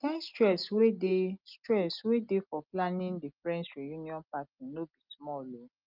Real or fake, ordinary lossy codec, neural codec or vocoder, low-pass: real; Opus, 32 kbps; none; 5.4 kHz